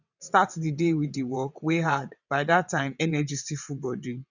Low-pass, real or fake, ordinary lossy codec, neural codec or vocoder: 7.2 kHz; fake; none; vocoder, 22.05 kHz, 80 mel bands, WaveNeXt